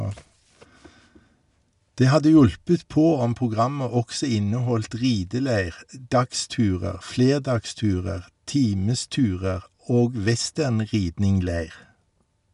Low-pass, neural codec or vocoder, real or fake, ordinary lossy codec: 10.8 kHz; none; real; none